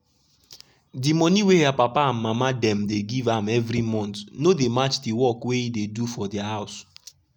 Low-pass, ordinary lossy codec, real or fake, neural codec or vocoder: 19.8 kHz; none; real; none